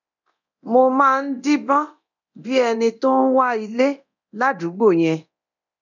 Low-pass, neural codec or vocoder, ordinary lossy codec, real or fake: 7.2 kHz; codec, 24 kHz, 0.9 kbps, DualCodec; none; fake